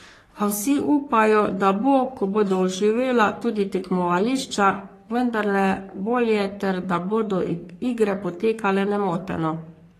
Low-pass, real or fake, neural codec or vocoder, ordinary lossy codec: 14.4 kHz; fake; codec, 44.1 kHz, 3.4 kbps, Pupu-Codec; AAC, 48 kbps